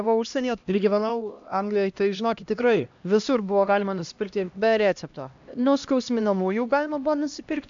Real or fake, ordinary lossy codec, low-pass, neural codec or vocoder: fake; AAC, 64 kbps; 7.2 kHz; codec, 16 kHz, 1 kbps, X-Codec, HuBERT features, trained on LibriSpeech